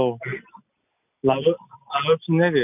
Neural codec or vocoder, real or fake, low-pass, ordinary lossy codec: none; real; 3.6 kHz; none